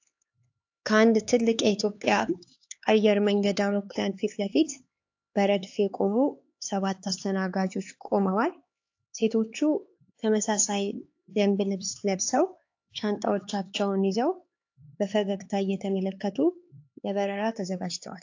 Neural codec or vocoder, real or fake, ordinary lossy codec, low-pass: codec, 16 kHz, 4 kbps, X-Codec, HuBERT features, trained on LibriSpeech; fake; AAC, 48 kbps; 7.2 kHz